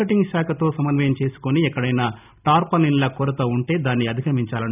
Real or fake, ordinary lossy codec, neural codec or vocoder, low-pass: real; none; none; 3.6 kHz